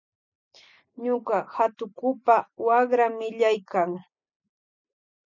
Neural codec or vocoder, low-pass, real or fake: none; 7.2 kHz; real